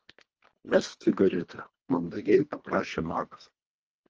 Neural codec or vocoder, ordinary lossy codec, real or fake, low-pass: codec, 24 kHz, 1.5 kbps, HILCodec; Opus, 24 kbps; fake; 7.2 kHz